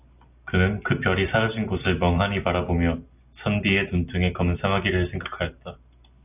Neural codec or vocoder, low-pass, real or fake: none; 3.6 kHz; real